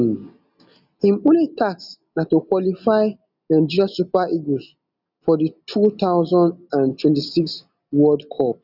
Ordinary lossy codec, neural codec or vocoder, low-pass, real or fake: none; none; 5.4 kHz; real